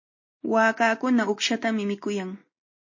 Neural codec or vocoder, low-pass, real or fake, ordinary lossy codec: none; 7.2 kHz; real; MP3, 32 kbps